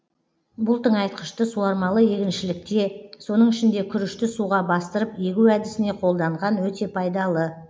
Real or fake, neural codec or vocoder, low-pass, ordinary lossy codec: real; none; none; none